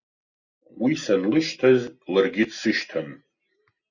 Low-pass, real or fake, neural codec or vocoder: 7.2 kHz; real; none